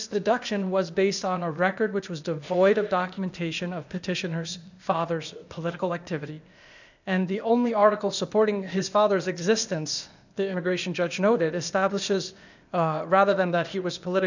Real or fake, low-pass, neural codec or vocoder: fake; 7.2 kHz; codec, 16 kHz, 0.8 kbps, ZipCodec